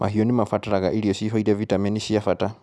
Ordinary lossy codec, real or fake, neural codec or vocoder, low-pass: none; real; none; none